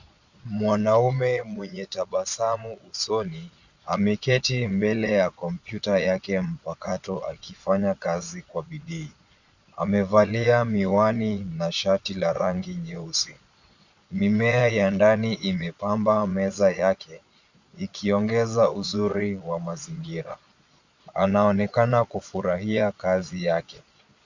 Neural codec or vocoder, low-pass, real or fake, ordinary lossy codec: vocoder, 22.05 kHz, 80 mel bands, Vocos; 7.2 kHz; fake; Opus, 64 kbps